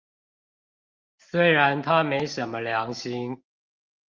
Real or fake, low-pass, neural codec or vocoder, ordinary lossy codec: real; 7.2 kHz; none; Opus, 24 kbps